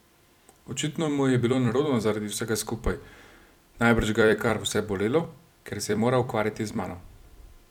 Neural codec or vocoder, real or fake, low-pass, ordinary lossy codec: vocoder, 44.1 kHz, 128 mel bands every 256 samples, BigVGAN v2; fake; 19.8 kHz; none